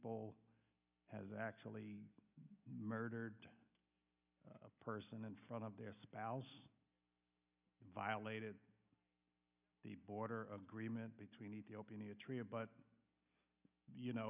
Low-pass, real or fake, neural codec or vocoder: 3.6 kHz; real; none